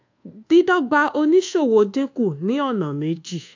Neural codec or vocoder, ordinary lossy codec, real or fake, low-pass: codec, 24 kHz, 1.2 kbps, DualCodec; AAC, 48 kbps; fake; 7.2 kHz